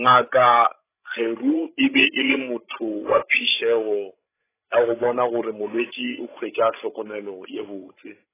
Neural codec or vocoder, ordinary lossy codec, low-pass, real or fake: codec, 16 kHz, 16 kbps, FreqCodec, larger model; AAC, 16 kbps; 3.6 kHz; fake